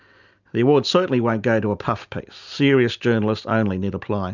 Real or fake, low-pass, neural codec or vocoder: real; 7.2 kHz; none